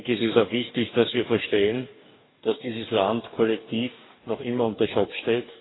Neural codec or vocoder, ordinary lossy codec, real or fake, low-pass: codec, 44.1 kHz, 2.6 kbps, DAC; AAC, 16 kbps; fake; 7.2 kHz